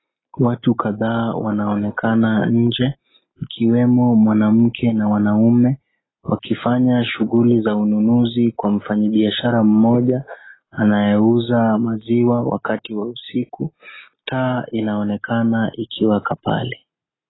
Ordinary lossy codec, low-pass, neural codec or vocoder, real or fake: AAC, 16 kbps; 7.2 kHz; none; real